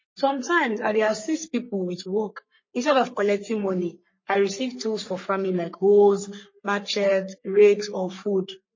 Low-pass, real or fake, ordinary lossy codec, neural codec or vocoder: 7.2 kHz; fake; MP3, 32 kbps; codec, 44.1 kHz, 3.4 kbps, Pupu-Codec